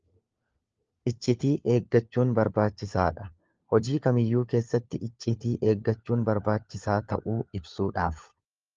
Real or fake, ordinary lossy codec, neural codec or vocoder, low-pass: fake; Opus, 24 kbps; codec, 16 kHz, 4 kbps, FunCodec, trained on LibriTTS, 50 frames a second; 7.2 kHz